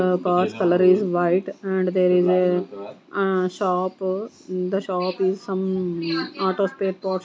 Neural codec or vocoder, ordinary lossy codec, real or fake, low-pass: none; none; real; none